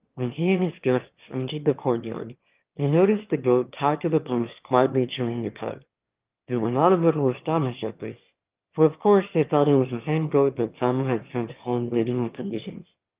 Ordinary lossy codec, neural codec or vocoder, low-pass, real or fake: Opus, 32 kbps; autoencoder, 22.05 kHz, a latent of 192 numbers a frame, VITS, trained on one speaker; 3.6 kHz; fake